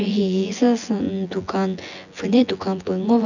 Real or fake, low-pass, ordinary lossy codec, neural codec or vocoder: fake; 7.2 kHz; none; vocoder, 24 kHz, 100 mel bands, Vocos